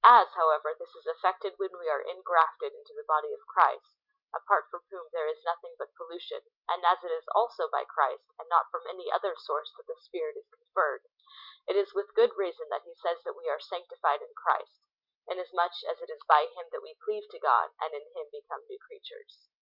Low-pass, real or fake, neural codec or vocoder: 5.4 kHz; real; none